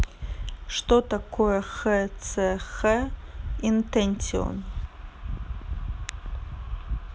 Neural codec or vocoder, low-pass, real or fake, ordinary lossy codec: none; none; real; none